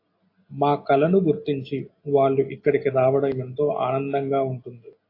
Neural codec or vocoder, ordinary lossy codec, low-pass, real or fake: none; AAC, 32 kbps; 5.4 kHz; real